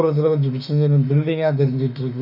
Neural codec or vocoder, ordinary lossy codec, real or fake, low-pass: autoencoder, 48 kHz, 32 numbers a frame, DAC-VAE, trained on Japanese speech; none; fake; 5.4 kHz